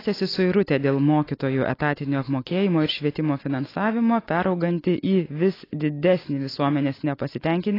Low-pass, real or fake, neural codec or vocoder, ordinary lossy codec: 5.4 kHz; real; none; AAC, 24 kbps